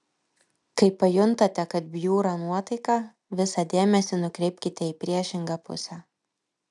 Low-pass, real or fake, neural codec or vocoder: 10.8 kHz; real; none